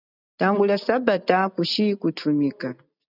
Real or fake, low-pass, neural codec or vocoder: real; 5.4 kHz; none